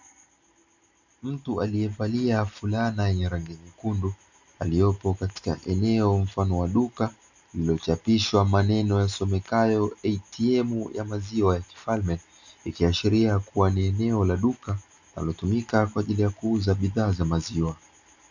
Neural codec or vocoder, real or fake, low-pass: none; real; 7.2 kHz